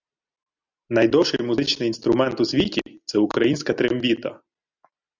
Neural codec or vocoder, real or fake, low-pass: none; real; 7.2 kHz